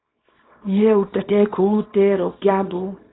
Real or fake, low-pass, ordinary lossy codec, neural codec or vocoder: fake; 7.2 kHz; AAC, 16 kbps; codec, 24 kHz, 0.9 kbps, WavTokenizer, small release